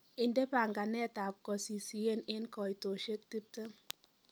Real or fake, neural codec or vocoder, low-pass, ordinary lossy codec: real; none; none; none